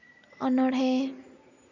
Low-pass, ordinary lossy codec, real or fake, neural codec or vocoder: 7.2 kHz; MP3, 64 kbps; real; none